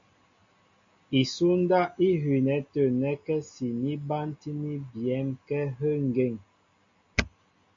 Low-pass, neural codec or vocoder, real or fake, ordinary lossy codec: 7.2 kHz; none; real; MP3, 48 kbps